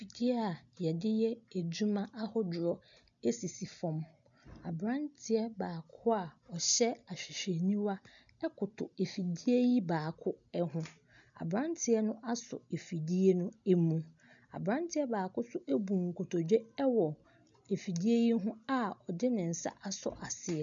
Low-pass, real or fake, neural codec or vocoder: 7.2 kHz; real; none